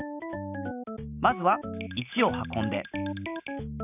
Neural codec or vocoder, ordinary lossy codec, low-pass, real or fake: none; none; 3.6 kHz; real